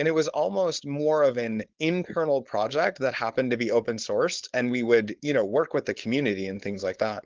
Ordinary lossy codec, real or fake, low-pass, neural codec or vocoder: Opus, 16 kbps; fake; 7.2 kHz; codec, 16 kHz, 4.8 kbps, FACodec